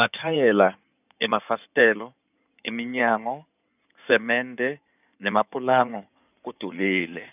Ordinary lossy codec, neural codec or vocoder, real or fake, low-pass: none; codec, 16 kHz in and 24 kHz out, 2.2 kbps, FireRedTTS-2 codec; fake; 3.6 kHz